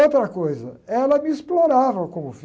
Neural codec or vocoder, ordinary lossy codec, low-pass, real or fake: none; none; none; real